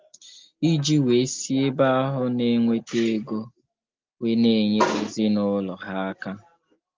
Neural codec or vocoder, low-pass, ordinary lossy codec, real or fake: none; 7.2 kHz; Opus, 24 kbps; real